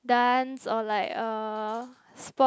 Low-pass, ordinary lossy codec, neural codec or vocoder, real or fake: none; none; none; real